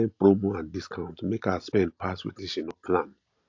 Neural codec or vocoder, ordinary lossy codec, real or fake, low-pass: none; AAC, 48 kbps; real; 7.2 kHz